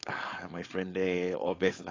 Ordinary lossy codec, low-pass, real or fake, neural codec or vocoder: AAC, 32 kbps; 7.2 kHz; fake; codec, 16 kHz, 4.8 kbps, FACodec